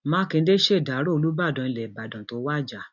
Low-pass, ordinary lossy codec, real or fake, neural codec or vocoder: 7.2 kHz; none; real; none